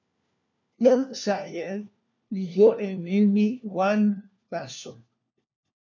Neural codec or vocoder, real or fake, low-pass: codec, 16 kHz, 1 kbps, FunCodec, trained on LibriTTS, 50 frames a second; fake; 7.2 kHz